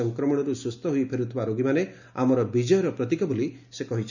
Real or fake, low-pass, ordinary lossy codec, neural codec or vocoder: real; 7.2 kHz; none; none